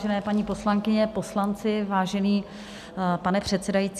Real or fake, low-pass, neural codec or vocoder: real; 14.4 kHz; none